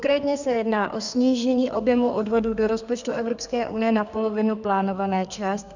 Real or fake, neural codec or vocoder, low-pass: fake; codec, 32 kHz, 1.9 kbps, SNAC; 7.2 kHz